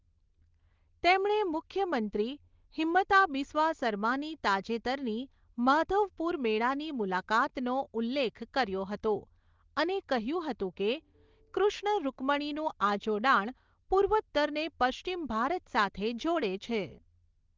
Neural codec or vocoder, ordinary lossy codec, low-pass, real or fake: none; Opus, 16 kbps; 7.2 kHz; real